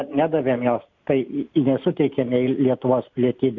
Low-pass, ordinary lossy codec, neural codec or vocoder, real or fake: 7.2 kHz; MP3, 64 kbps; none; real